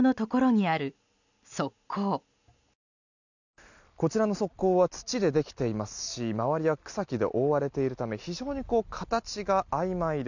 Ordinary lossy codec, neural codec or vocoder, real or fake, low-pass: none; none; real; 7.2 kHz